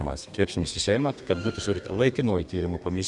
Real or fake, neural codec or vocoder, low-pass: fake; codec, 32 kHz, 1.9 kbps, SNAC; 10.8 kHz